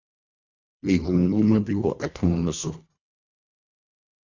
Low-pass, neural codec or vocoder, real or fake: 7.2 kHz; codec, 24 kHz, 1.5 kbps, HILCodec; fake